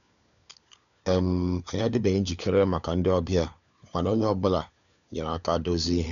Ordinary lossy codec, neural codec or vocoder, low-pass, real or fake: none; codec, 16 kHz, 4 kbps, FunCodec, trained on LibriTTS, 50 frames a second; 7.2 kHz; fake